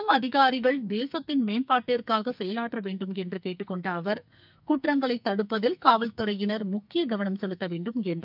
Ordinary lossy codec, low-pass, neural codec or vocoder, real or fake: none; 5.4 kHz; codec, 44.1 kHz, 2.6 kbps, SNAC; fake